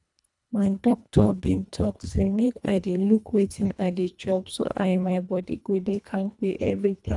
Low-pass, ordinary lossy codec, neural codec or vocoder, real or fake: 10.8 kHz; none; codec, 24 kHz, 1.5 kbps, HILCodec; fake